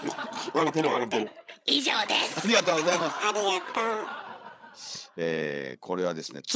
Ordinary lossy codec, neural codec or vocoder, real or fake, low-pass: none; codec, 16 kHz, 8 kbps, FreqCodec, larger model; fake; none